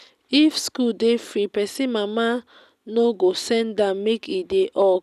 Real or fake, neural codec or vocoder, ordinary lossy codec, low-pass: real; none; none; 14.4 kHz